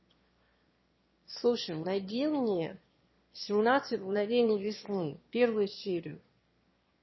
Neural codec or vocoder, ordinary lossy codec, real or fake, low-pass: autoencoder, 22.05 kHz, a latent of 192 numbers a frame, VITS, trained on one speaker; MP3, 24 kbps; fake; 7.2 kHz